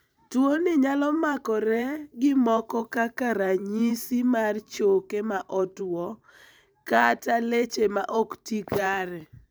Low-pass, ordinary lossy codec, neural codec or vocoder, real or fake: none; none; vocoder, 44.1 kHz, 128 mel bands every 512 samples, BigVGAN v2; fake